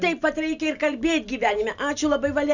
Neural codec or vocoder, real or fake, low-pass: none; real; 7.2 kHz